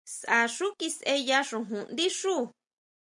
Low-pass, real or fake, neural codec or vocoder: 10.8 kHz; real; none